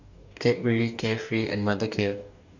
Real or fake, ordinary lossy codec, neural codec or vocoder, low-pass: fake; none; codec, 44.1 kHz, 2.6 kbps, DAC; 7.2 kHz